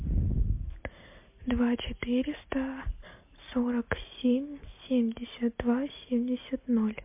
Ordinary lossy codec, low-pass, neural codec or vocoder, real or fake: MP3, 24 kbps; 3.6 kHz; none; real